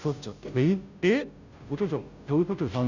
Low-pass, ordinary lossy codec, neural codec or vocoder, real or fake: 7.2 kHz; none; codec, 16 kHz, 0.5 kbps, FunCodec, trained on Chinese and English, 25 frames a second; fake